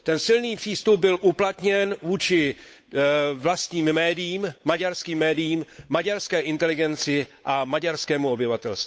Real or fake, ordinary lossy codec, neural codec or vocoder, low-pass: fake; none; codec, 16 kHz, 8 kbps, FunCodec, trained on Chinese and English, 25 frames a second; none